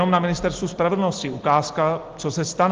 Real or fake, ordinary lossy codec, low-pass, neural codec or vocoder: real; Opus, 16 kbps; 7.2 kHz; none